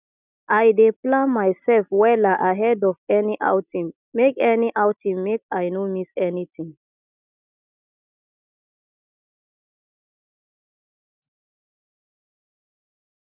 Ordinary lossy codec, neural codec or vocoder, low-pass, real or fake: none; none; 3.6 kHz; real